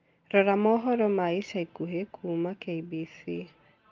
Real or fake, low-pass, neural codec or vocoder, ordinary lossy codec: real; 7.2 kHz; none; Opus, 32 kbps